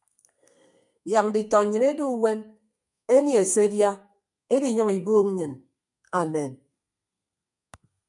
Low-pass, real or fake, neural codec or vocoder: 10.8 kHz; fake; codec, 32 kHz, 1.9 kbps, SNAC